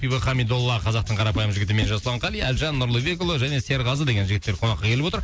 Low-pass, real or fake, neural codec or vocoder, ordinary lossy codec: none; real; none; none